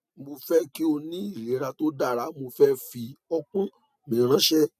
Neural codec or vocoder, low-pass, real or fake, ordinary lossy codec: none; 14.4 kHz; real; none